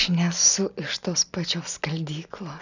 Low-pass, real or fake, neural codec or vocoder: 7.2 kHz; real; none